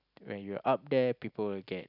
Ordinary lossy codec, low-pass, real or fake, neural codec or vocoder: none; 5.4 kHz; real; none